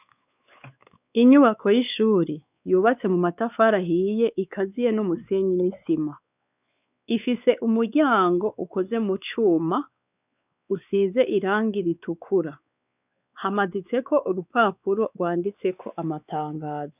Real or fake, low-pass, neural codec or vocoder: fake; 3.6 kHz; codec, 16 kHz, 4 kbps, X-Codec, WavLM features, trained on Multilingual LibriSpeech